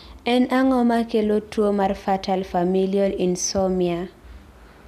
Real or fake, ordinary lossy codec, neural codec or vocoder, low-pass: real; none; none; 14.4 kHz